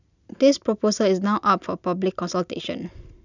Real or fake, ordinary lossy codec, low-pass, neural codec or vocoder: real; none; 7.2 kHz; none